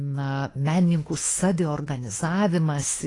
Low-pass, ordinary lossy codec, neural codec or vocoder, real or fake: 10.8 kHz; AAC, 32 kbps; autoencoder, 48 kHz, 32 numbers a frame, DAC-VAE, trained on Japanese speech; fake